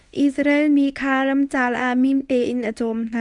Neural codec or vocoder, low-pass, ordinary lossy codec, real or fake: codec, 24 kHz, 0.9 kbps, WavTokenizer, medium speech release version 1; 10.8 kHz; Opus, 64 kbps; fake